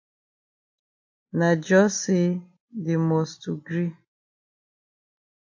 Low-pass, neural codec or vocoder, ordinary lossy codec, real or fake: 7.2 kHz; none; AAC, 48 kbps; real